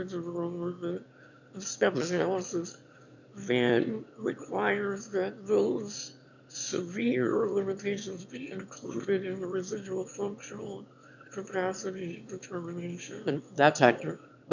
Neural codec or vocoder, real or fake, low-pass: autoencoder, 22.05 kHz, a latent of 192 numbers a frame, VITS, trained on one speaker; fake; 7.2 kHz